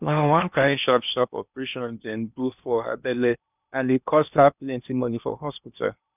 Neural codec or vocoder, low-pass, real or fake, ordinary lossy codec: codec, 16 kHz in and 24 kHz out, 0.8 kbps, FocalCodec, streaming, 65536 codes; 3.6 kHz; fake; none